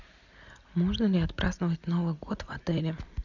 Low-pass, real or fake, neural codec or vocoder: 7.2 kHz; real; none